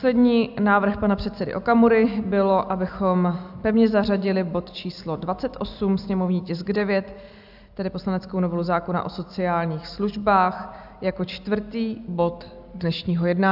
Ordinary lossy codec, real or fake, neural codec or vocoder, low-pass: AAC, 48 kbps; real; none; 5.4 kHz